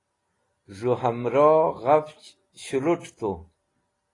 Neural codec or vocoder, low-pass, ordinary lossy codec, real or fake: none; 10.8 kHz; AAC, 32 kbps; real